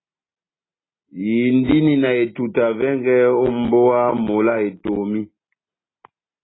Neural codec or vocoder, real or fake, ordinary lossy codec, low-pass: none; real; AAC, 16 kbps; 7.2 kHz